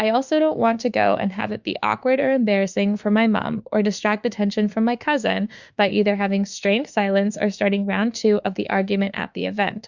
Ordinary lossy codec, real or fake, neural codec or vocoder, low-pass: Opus, 64 kbps; fake; autoencoder, 48 kHz, 32 numbers a frame, DAC-VAE, trained on Japanese speech; 7.2 kHz